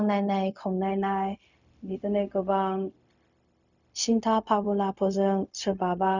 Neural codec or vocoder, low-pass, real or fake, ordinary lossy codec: codec, 16 kHz, 0.4 kbps, LongCat-Audio-Codec; 7.2 kHz; fake; none